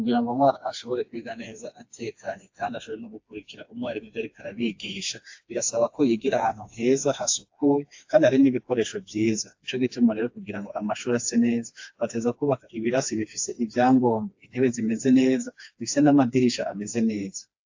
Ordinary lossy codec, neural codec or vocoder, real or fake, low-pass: AAC, 48 kbps; codec, 16 kHz, 2 kbps, FreqCodec, smaller model; fake; 7.2 kHz